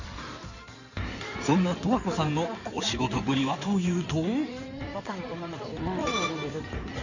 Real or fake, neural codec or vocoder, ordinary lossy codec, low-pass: fake; codec, 16 kHz in and 24 kHz out, 2.2 kbps, FireRedTTS-2 codec; none; 7.2 kHz